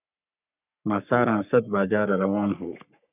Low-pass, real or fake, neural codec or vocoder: 3.6 kHz; fake; codec, 44.1 kHz, 3.4 kbps, Pupu-Codec